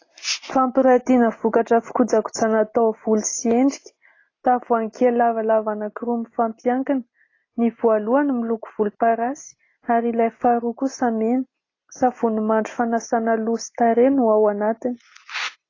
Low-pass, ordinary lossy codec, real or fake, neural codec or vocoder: 7.2 kHz; AAC, 32 kbps; real; none